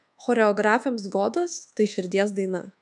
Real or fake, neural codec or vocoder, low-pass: fake; codec, 24 kHz, 1.2 kbps, DualCodec; 10.8 kHz